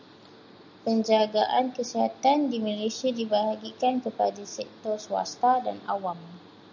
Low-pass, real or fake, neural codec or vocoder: 7.2 kHz; real; none